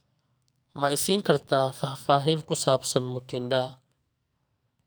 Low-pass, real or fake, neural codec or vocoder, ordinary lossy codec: none; fake; codec, 44.1 kHz, 2.6 kbps, SNAC; none